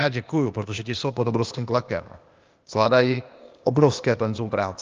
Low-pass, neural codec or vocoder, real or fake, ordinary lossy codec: 7.2 kHz; codec, 16 kHz, 0.8 kbps, ZipCodec; fake; Opus, 24 kbps